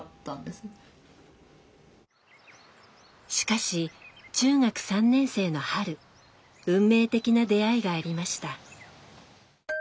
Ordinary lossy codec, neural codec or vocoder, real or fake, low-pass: none; none; real; none